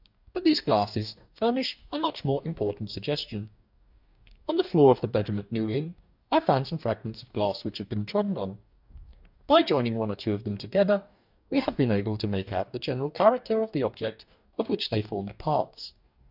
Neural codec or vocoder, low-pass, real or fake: codec, 44.1 kHz, 2.6 kbps, DAC; 5.4 kHz; fake